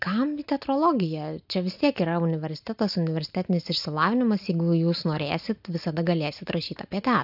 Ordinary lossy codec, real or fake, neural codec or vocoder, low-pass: AAC, 48 kbps; real; none; 5.4 kHz